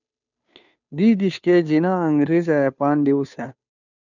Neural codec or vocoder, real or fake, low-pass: codec, 16 kHz, 2 kbps, FunCodec, trained on Chinese and English, 25 frames a second; fake; 7.2 kHz